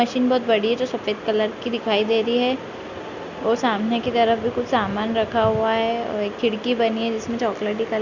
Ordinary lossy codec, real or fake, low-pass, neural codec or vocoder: Opus, 64 kbps; real; 7.2 kHz; none